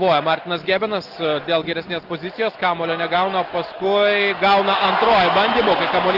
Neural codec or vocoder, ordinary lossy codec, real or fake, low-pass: none; Opus, 16 kbps; real; 5.4 kHz